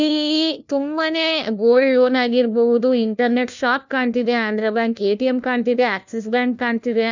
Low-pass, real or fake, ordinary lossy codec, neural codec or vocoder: 7.2 kHz; fake; none; codec, 16 kHz, 1 kbps, FunCodec, trained on LibriTTS, 50 frames a second